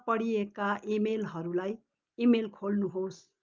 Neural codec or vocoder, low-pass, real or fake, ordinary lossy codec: none; 7.2 kHz; real; Opus, 24 kbps